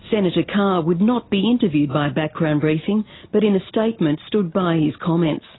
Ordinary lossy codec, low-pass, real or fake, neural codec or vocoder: AAC, 16 kbps; 7.2 kHz; real; none